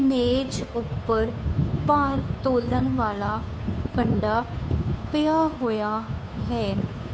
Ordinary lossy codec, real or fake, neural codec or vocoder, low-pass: none; fake; codec, 16 kHz, 2 kbps, FunCodec, trained on Chinese and English, 25 frames a second; none